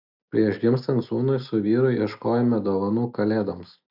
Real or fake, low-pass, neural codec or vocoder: real; 5.4 kHz; none